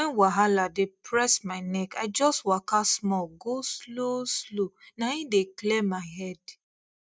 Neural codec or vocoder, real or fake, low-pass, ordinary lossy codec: none; real; none; none